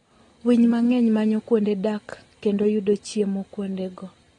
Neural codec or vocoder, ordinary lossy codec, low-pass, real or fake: none; AAC, 32 kbps; 10.8 kHz; real